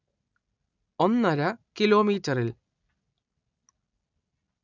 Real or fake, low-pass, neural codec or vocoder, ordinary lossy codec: real; 7.2 kHz; none; none